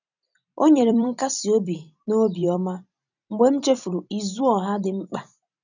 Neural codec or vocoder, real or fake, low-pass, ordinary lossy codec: vocoder, 44.1 kHz, 128 mel bands every 256 samples, BigVGAN v2; fake; 7.2 kHz; none